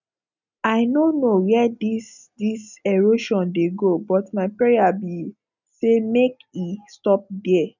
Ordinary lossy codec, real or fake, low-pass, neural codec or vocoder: none; real; 7.2 kHz; none